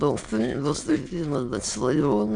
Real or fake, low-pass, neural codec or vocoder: fake; 9.9 kHz; autoencoder, 22.05 kHz, a latent of 192 numbers a frame, VITS, trained on many speakers